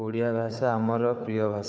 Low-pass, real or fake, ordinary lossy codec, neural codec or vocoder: none; fake; none; codec, 16 kHz, 4 kbps, FunCodec, trained on Chinese and English, 50 frames a second